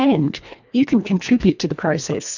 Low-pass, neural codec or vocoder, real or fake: 7.2 kHz; codec, 24 kHz, 1.5 kbps, HILCodec; fake